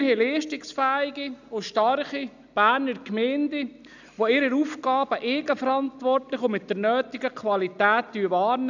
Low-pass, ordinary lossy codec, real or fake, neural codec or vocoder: 7.2 kHz; none; real; none